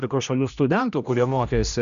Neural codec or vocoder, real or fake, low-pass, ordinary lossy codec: codec, 16 kHz, 1 kbps, X-Codec, HuBERT features, trained on general audio; fake; 7.2 kHz; MP3, 96 kbps